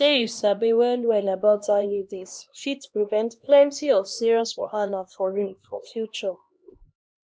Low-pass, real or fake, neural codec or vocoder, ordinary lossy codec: none; fake; codec, 16 kHz, 1 kbps, X-Codec, HuBERT features, trained on LibriSpeech; none